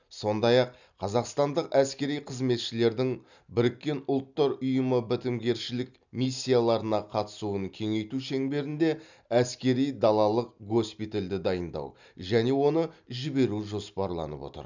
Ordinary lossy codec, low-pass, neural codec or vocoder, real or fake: none; 7.2 kHz; none; real